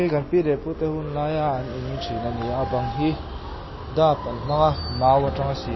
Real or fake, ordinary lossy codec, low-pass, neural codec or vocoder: real; MP3, 24 kbps; 7.2 kHz; none